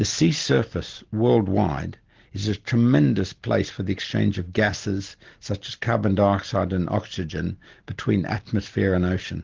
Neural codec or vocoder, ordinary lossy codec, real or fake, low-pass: none; Opus, 16 kbps; real; 7.2 kHz